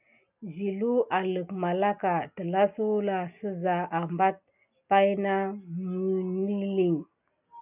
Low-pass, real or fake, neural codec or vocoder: 3.6 kHz; real; none